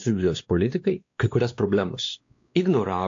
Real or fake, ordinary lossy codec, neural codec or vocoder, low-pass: fake; AAC, 48 kbps; codec, 16 kHz, 2 kbps, X-Codec, WavLM features, trained on Multilingual LibriSpeech; 7.2 kHz